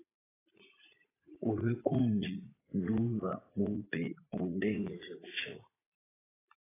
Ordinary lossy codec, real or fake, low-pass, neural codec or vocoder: AAC, 16 kbps; fake; 3.6 kHz; vocoder, 44.1 kHz, 80 mel bands, Vocos